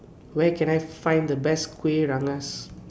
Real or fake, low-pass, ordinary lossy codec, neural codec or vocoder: real; none; none; none